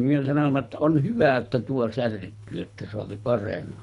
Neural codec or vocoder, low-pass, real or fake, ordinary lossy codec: codec, 24 kHz, 3 kbps, HILCodec; 10.8 kHz; fake; none